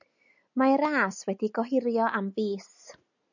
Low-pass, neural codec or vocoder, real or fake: 7.2 kHz; none; real